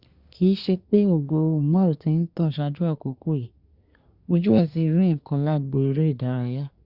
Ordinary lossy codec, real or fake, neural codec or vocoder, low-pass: Opus, 64 kbps; fake; codec, 24 kHz, 1 kbps, SNAC; 5.4 kHz